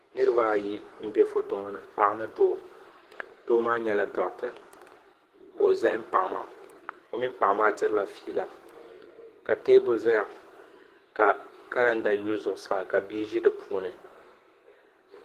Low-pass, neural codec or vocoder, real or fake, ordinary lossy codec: 14.4 kHz; codec, 44.1 kHz, 2.6 kbps, SNAC; fake; Opus, 16 kbps